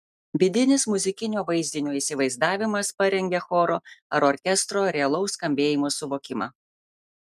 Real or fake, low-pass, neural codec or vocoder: real; 14.4 kHz; none